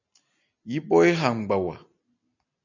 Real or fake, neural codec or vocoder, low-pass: real; none; 7.2 kHz